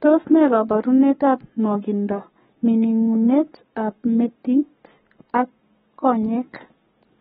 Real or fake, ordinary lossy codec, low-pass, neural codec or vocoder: fake; AAC, 16 kbps; 19.8 kHz; codec, 44.1 kHz, 7.8 kbps, Pupu-Codec